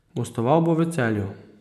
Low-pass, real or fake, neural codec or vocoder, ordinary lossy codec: 14.4 kHz; real; none; none